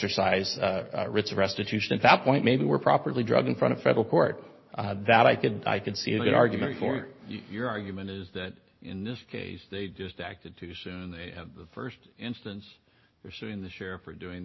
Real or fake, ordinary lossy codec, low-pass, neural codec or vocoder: real; MP3, 24 kbps; 7.2 kHz; none